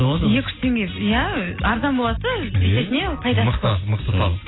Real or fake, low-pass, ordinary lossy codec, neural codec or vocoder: real; 7.2 kHz; AAC, 16 kbps; none